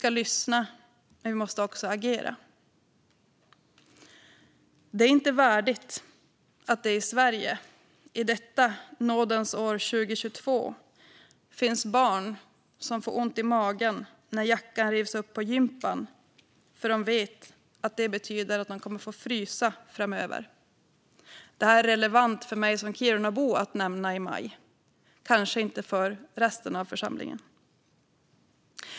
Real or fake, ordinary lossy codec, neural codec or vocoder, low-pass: real; none; none; none